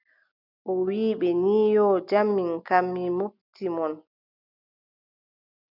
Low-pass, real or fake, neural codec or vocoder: 5.4 kHz; real; none